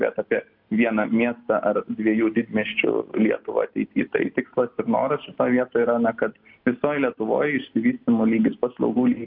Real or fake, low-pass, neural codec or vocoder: real; 5.4 kHz; none